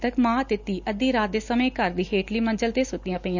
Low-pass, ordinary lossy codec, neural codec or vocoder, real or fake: 7.2 kHz; none; none; real